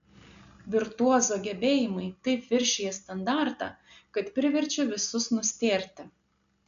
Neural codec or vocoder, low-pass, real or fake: none; 7.2 kHz; real